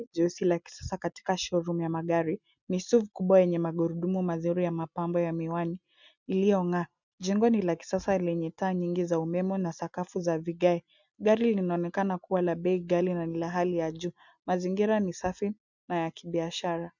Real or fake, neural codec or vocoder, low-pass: real; none; 7.2 kHz